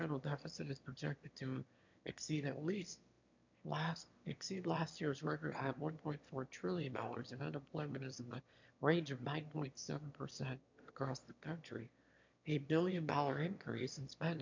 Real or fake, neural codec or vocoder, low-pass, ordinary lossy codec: fake; autoencoder, 22.05 kHz, a latent of 192 numbers a frame, VITS, trained on one speaker; 7.2 kHz; MP3, 64 kbps